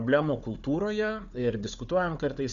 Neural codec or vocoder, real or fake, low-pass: codec, 16 kHz, 4 kbps, FunCodec, trained on Chinese and English, 50 frames a second; fake; 7.2 kHz